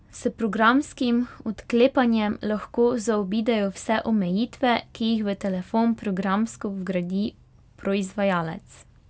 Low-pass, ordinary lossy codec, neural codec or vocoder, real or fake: none; none; none; real